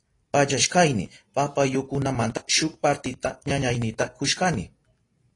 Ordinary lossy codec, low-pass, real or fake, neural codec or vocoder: AAC, 32 kbps; 10.8 kHz; real; none